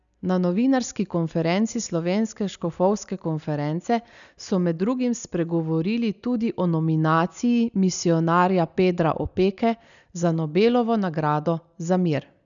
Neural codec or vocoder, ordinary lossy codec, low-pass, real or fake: none; none; 7.2 kHz; real